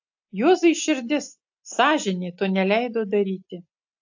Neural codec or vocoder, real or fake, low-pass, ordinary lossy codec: none; real; 7.2 kHz; AAC, 48 kbps